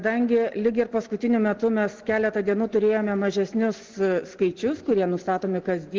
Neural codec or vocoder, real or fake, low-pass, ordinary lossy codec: none; real; 7.2 kHz; Opus, 16 kbps